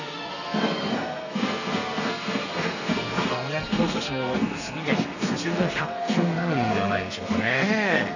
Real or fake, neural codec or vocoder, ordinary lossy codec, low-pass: fake; codec, 32 kHz, 1.9 kbps, SNAC; none; 7.2 kHz